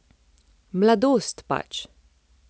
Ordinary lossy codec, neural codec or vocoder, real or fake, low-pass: none; none; real; none